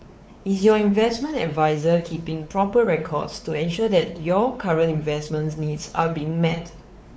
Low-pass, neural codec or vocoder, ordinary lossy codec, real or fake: none; codec, 16 kHz, 4 kbps, X-Codec, WavLM features, trained on Multilingual LibriSpeech; none; fake